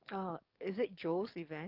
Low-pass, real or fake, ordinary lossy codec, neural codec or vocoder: 5.4 kHz; fake; Opus, 32 kbps; codec, 44.1 kHz, 7.8 kbps, DAC